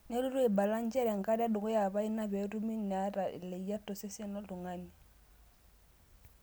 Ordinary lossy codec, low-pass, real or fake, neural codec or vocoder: none; none; real; none